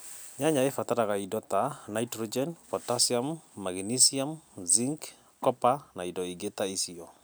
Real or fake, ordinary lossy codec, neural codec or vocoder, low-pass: real; none; none; none